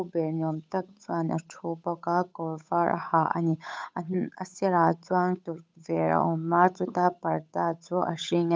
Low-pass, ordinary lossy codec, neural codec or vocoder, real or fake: none; none; codec, 16 kHz, 8 kbps, FunCodec, trained on Chinese and English, 25 frames a second; fake